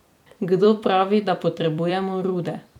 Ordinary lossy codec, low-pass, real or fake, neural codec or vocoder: none; 19.8 kHz; fake; vocoder, 44.1 kHz, 128 mel bands every 256 samples, BigVGAN v2